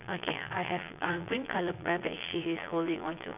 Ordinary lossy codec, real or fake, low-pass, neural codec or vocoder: none; fake; 3.6 kHz; vocoder, 22.05 kHz, 80 mel bands, Vocos